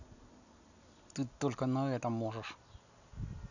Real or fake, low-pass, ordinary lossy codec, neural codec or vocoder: real; 7.2 kHz; none; none